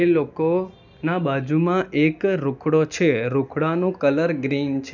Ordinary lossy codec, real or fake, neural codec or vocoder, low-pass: none; real; none; 7.2 kHz